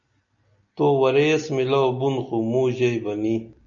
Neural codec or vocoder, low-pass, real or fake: none; 7.2 kHz; real